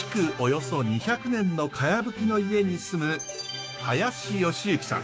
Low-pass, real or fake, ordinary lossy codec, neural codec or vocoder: none; fake; none; codec, 16 kHz, 6 kbps, DAC